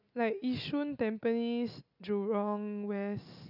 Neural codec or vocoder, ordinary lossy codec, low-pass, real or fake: none; none; 5.4 kHz; real